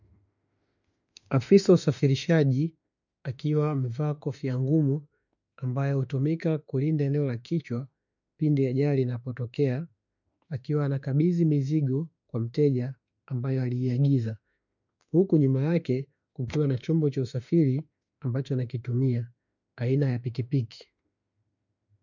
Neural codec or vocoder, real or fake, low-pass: autoencoder, 48 kHz, 32 numbers a frame, DAC-VAE, trained on Japanese speech; fake; 7.2 kHz